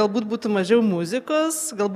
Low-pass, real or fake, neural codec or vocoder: 14.4 kHz; real; none